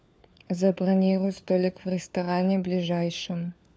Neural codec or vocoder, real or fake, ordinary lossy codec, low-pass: codec, 16 kHz, 4 kbps, FunCodec, trained on LibriTTS, 50 frames a second; fake; none; none